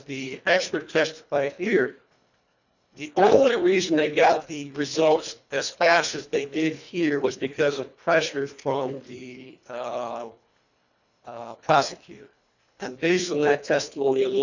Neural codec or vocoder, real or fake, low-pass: codec, 24 kHz, 1.5 kbps, HILCodec; fake; 7.2 kHz